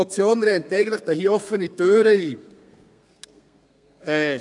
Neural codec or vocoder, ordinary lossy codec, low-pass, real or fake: codec, 32 kHz, 1.9 kbps, SNAC; none; 10.8 kHz; fake